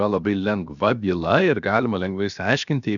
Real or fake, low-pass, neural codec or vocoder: fake; 7.2 kHz; codec, 16 kHz, 0.7 kbps, FocalCodec